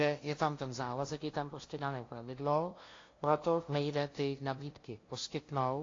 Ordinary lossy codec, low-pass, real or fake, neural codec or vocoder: AAC, 32 kbps; 7.2 kHz; fake; codec, 16 kHz, 0.5 kbps, FunCodec, trained on LibriTTS, 25 frames a second